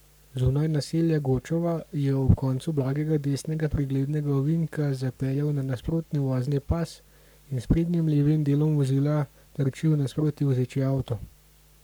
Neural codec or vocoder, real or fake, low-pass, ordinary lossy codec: codec, 44.1 kHz, 7.8 kbps, Pupu-Codec; fake; none; none